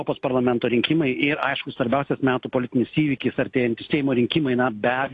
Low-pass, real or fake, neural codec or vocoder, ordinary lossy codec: 10.8 kHz; real; none; AAC, 48 kbps